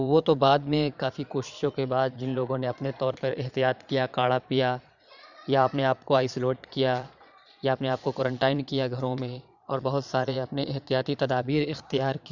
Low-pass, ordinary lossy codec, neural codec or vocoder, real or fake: 7.2 kHz; none; vocoder, 22.05 kHz, 80 mel bands, Vocos; fake